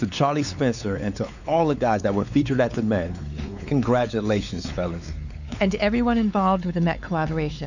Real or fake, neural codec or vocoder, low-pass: fake; codec, 16 kHz, 4 kbps, X-Codec, WavLM features, trained on Multilingual LibriSpeech; 7.2 kHz